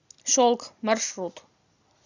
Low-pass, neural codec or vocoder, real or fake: 7.2 kHz; none; real